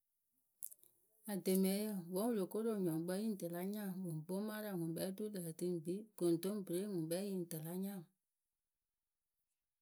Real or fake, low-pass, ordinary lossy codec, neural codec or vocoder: real; none; none; none